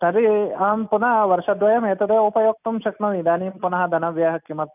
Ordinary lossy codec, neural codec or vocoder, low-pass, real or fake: none; none; 3.6 kHz; real